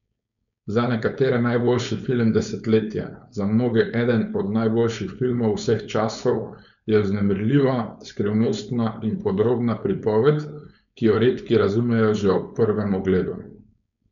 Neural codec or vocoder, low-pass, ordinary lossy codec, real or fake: codec, 16 kHz, 4.8 kbps, FACodec; 7.2 kHz; none; fake